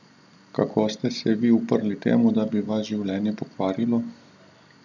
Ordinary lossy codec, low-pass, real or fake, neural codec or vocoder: none; 7.2 kHz; real; none